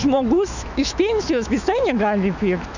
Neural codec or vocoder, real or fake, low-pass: autoencoder, 48 kHz, 128 numbers a frame, DAC-VAE, trained on Japanese speech; fake; 7.2 kHz